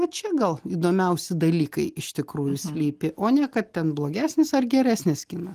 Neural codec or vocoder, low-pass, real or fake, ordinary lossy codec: none; 14.4 kHz; real; Opus, 24 kbps